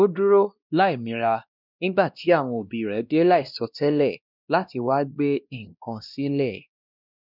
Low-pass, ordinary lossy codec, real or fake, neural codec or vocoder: 5.4 kHz; none; fake; codec, 16 kHz, 1 kbps, X-Codec, WavLM features, trained on Multilingual LibriSpeech